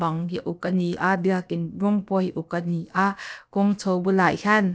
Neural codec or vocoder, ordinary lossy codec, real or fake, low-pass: codec, 16 kHz, about 1 kbps, DyCAST, with the encoder's durations; none; fake; none